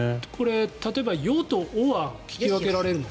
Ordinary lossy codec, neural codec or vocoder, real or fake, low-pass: none; none; real; none